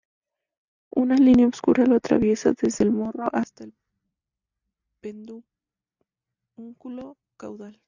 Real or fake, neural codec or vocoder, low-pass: real; none; 7.2 kHz